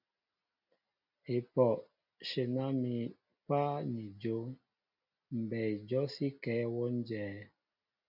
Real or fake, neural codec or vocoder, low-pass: real; none; 5.4 kHz